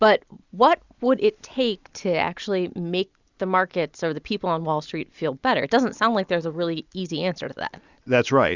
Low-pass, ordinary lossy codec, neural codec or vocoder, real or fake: 7.2 kHz; Opus, 64 kbps; none; real